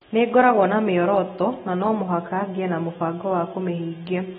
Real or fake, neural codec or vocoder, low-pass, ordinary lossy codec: real; none; 19.8 kHz; AAC, 16 kbps